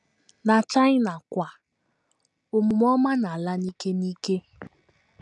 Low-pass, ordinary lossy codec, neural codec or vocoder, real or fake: 10.8 kHz; none; none; real